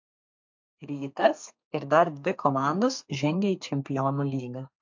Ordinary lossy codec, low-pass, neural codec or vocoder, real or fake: MP3, 48 kbps; 7.2 kHz; codec, 32 kHz, 1.9 kbps, SNAC; fake